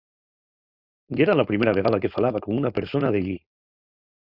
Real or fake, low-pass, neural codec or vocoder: fake; 5.4 kHz; codec, 16 kHz, 4.8 kbps, FACodec